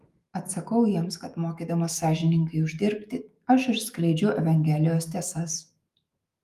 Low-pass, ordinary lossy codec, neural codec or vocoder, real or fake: 14.4 kHz; Opus, 32 kbps; autoencoder, 48 kHz, 128 numbers a frame, DAC-VAE, trained on Japanese speech; fake